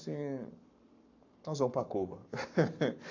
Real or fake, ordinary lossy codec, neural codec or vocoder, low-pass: fake; AAC, 48 kbps; codec, 44.1 kHz, 7.8 kbps, Pupu-Codec; 7.2 kHz